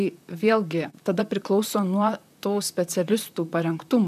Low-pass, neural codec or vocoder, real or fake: 14.4 kHz; vocoder, 44.1 kHz, 128 mel bands, Pupu-Vocoder; fake